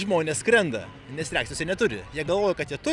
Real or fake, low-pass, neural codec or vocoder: real; 10.8 kHz; none